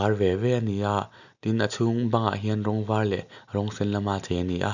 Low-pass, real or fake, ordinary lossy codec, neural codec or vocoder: 7.2 kHz; real; none; none